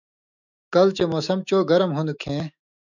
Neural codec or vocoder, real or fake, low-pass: autoencoder, 48 kHz, 128 numbers a frame, DAC-VAE, trained on Japanese speech; fake; 7.2 kHz